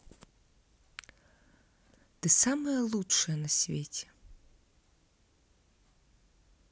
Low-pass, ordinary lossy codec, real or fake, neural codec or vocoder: none; none; real; none